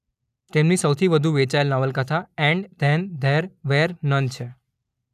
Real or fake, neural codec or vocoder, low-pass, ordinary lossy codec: real; none; 14.4 kHz; none